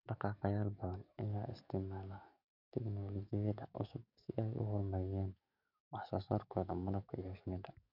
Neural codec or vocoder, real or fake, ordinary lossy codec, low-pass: codec, 44.1 kHz, 7.8 kbps, DAC; fake; none; 5.4 kHz